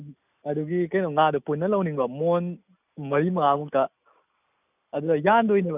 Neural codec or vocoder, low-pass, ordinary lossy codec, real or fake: none; 3.6 kHz; none; real